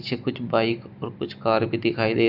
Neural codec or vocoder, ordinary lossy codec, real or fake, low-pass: none; none; real; 5.4 kHz